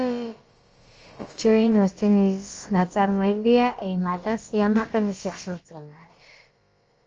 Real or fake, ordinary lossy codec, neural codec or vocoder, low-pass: fake; Opus, 24 kbps; codec, 16 kHz, about 1 kbps, DyCAST, with the encoder's durations; 7.2 kHz